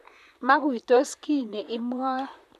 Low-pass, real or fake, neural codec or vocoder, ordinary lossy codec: 14.4 kHz; fake; vocoder, 44.1 kHz, 128 mel bands, Pupu-Vocoder; none